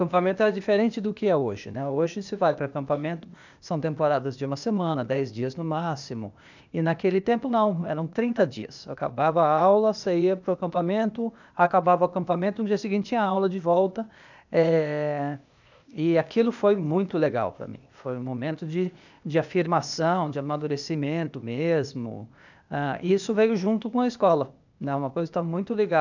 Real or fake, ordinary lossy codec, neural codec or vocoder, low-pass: fake; none; codec, 16 kHz, 0.8 kbps, ZipCodec; 7.2 kHz